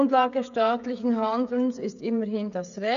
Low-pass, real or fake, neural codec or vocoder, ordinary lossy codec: 7.2 kHz; fake; codec, 16 kHz, 16 kbps, FreqCodec, smaller model; none